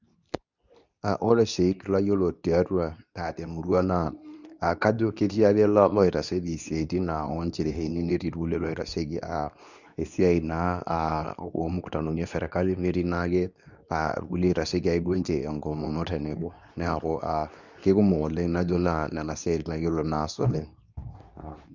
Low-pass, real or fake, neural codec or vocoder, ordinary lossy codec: 7.2 kHz; fake; codec, 24 kHz, 0.9 kbps, WavTokenizer, medium speech release version 2; none